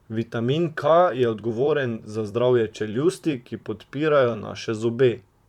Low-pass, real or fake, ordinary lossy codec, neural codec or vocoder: 19.8 kHz; fake; none; vocoder, 44.1 kHz, 128 mel bands, Pupu-Vocoder